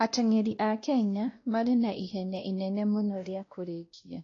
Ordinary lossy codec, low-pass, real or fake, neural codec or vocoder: AAC, 32 kbps; 7.2 kHz; fake; codec, 16 kHz, 1 kbps, X-Codec, WavLM features, trained on Multilingual LibriSpeech